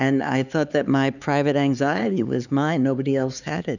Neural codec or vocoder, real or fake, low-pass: codec, 16 kHz, 4 kbps, X-Codec, HuBERT features, trained on LibriSpeech; fake; 7.2 kHz